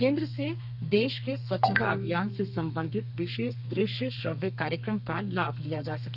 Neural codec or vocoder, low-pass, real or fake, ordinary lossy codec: codec, 32 kHz, 1.9 kbps, SNAC; 5.4 kHz; fake; none